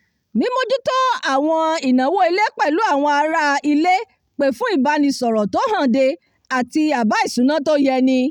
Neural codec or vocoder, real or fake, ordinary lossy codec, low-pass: none; real; none; 19.8 kHz